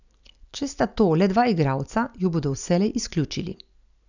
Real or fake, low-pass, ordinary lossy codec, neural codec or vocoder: real; 7.2 kHz; none; none